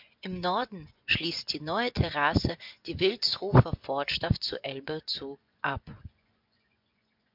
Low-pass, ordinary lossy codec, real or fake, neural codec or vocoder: 5.4 kHz; MP3, 48 kbps; fake; vocoder, 24 kHz, 100 mel bands, Vocos